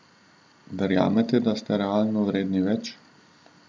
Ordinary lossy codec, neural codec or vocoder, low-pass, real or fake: none; none; none; real